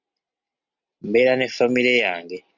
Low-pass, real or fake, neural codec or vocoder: 7.2 kHz; real; none